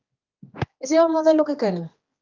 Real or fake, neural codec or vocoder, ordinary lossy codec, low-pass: fake; codec, 16 kHz, 2 kbps, X-Codec, HuBERT features, trained on general audio; Opus, 32 kbps; 7.2 kHz